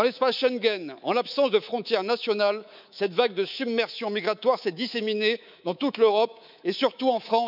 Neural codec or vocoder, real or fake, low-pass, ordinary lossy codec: codec, 24 kHz, 3.1 kbps, DualCodec; fake; 5.4 kHz; none